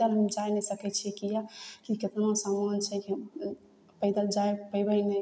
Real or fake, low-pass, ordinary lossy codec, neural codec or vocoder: real; none; none; none